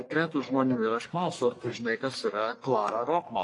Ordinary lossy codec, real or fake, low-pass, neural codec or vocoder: AAC, 48 kbps; fake; 10.8 kHz; codec, 44.1 kHz, 1.7 kbps, Pupu-Codec